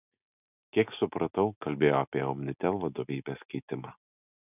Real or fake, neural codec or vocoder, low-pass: real; none; 3.6 kHz